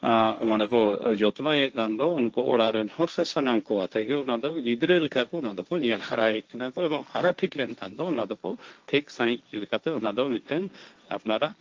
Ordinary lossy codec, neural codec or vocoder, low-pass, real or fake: Opus, 24 kbps; codec, 16 kHz, 1.1 kbps, Voila-Tokenizer; 7.2 kHz; fake